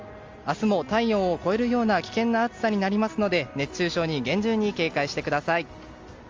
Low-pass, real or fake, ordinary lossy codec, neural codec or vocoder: 7.2 kHz; real; Opus, 32 kbps; none